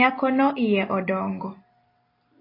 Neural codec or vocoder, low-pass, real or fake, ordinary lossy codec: none; 5.4 kHz; real; AAC, 24 kbps